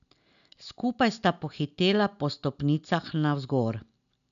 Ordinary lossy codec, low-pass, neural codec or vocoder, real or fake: none; 7.2 kHz; none; real